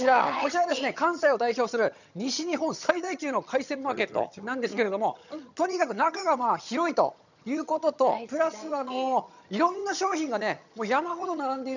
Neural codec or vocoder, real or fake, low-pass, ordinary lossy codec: vocoder, 22.05 kHz, 80 mel bands, HiFi-GAN; fake; 7.2 kHz; none